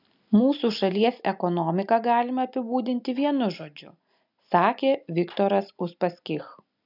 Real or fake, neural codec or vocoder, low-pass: real; none; 5.4 kHz